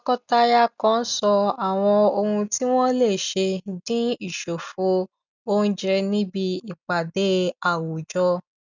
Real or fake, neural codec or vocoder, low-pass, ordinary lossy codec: real; none; 7.2 kHz; none